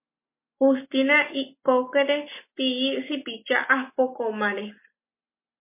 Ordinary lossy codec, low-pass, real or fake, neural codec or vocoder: MP3, 24 kbps; 3.6 kHz; real; none